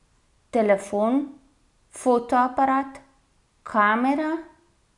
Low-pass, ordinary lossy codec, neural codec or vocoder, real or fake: 10.8 kHz; none; none; real